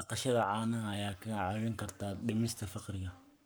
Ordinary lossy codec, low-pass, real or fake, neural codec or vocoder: none; none; fake; codec, 44.1 kHz, 7.8 kbps, Pupu-Codec